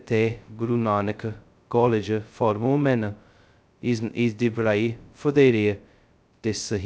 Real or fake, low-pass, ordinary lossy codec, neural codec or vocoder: fake; none; none; codec, 16 kHz, 0.2 kbps, FocalCodec